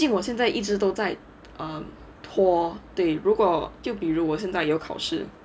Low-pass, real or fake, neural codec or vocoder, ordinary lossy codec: none; real; none; none